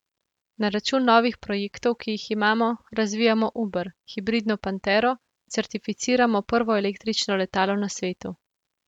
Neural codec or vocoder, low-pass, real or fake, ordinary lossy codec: none; 19.8 kHz; real; none